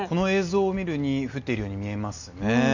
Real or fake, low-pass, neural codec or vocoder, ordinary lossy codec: real; 7.2 kHz; none; none